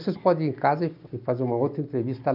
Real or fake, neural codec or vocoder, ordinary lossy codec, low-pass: real; none; none; 5.4 kHz